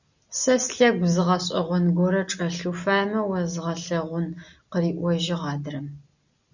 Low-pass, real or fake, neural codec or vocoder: 7.2 kHz; real; none